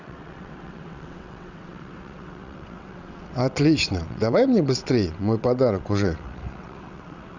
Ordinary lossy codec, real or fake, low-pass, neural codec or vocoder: none; fake; 7.2 kHz; vocoder, 22.05 kHz, 80 mel bands, WaveNeXt